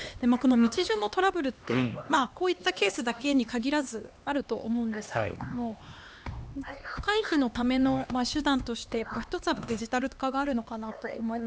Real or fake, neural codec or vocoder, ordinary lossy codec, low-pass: fake; codec, 16 kHz, 2 kbps, X-Codec, HuBERT features, trained on LibriSpeech; none; none